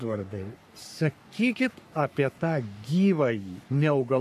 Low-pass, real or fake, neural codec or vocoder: 14.4 kHz; fake; codec, 44.1 kHz, 3.4 kbps, Pupu-Codec